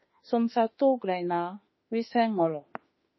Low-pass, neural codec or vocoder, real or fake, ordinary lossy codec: 7.2 kHz; autoencoder, 48 kHz, 32 numbers a frame, DAC-VAE, trained on Japanese speech; fake; MP3, 24 kbps